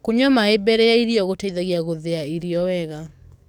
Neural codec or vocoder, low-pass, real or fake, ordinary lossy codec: codec, 44.1 kHz, 7.8 kbps, DAC; 19.8 kHz; fake; none